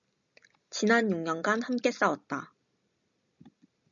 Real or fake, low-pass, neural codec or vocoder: real; 7.2 kHz; none